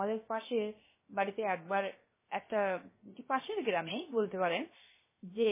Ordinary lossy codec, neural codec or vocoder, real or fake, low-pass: MP3, 16 kbps; codec, 16 kHz, 0.7 kbps, FocalCodec; fake; 3.6 kHz